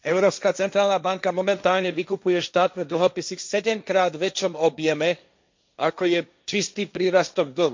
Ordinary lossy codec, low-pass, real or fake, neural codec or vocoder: MP3, 64 kbps; 7.2 kHz; fake; codec, 16 kHz, 1.1 kbps, Voila-Tokenizer